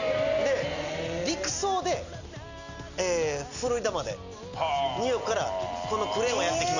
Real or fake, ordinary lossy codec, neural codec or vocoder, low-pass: real; none; none; 7.2 kHz